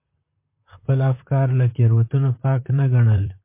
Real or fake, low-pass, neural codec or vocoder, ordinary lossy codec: fake; 3.6 kHz; vocoder, 44.1 kHz, 128 mel bands, Pupu-Vocoder; MP3, 24 kbps